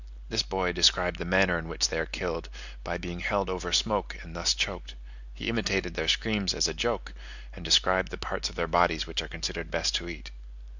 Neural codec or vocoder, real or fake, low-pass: none; real; 7.2 kHz